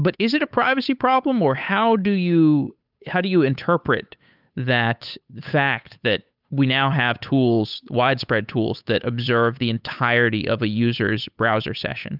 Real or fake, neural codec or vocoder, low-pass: fake; codec, 16 kHz, 8 kbps, FunCodec, trained on Chinese and English, 25 frames a second; 5.4 kHz